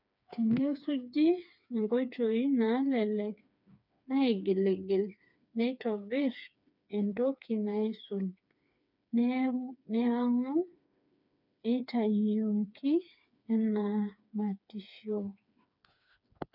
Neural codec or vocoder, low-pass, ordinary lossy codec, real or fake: codec, 16 kHz, 4 kbps, FreqCodec, smaller model; 5.4 kHz; none; fake